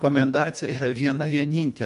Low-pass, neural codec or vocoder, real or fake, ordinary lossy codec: 10.8 kHz; codec, 24 kHz, 1.5 kbps, HILCodec; fake; MP3, 64 kbps